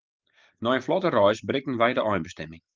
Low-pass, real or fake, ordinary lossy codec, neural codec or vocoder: 7.2 kHz; real; Opus, 24 kbps; none